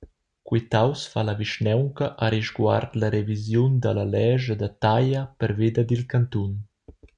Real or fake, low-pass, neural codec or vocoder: real; 9.9 kHz; none